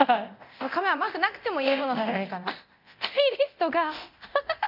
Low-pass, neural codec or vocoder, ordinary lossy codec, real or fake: 5.4 kHz; codec, 24 kHz, 0.9 kbps, DualCodec; none; fake